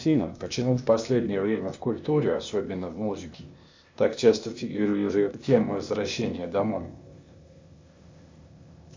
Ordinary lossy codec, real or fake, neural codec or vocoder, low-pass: MP3, 64 kbps; fake; codec, 16 kHz, 0.8 kbps, ZipCodec; 7.2 kHz